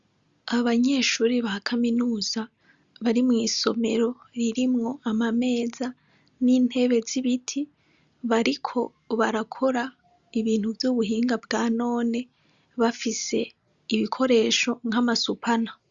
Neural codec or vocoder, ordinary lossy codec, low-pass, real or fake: none; Opus, 64 kbps; 7.2 kHz; real